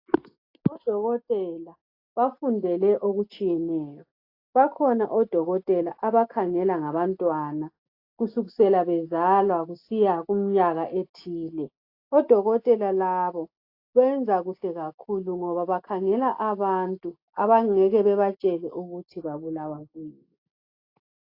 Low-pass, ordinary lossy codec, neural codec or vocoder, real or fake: 5.4 kHz; AAC, 32 kbps; none; real